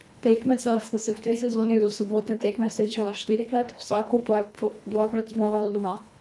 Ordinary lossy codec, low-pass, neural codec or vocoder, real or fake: none; none; codec, 24 kHz, 1.5 kbps, HILCodec; fake